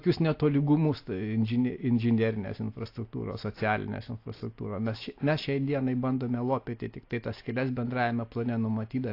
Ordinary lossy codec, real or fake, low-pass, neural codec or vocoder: AAC, 32 kbps; real; 5.4 kHz; none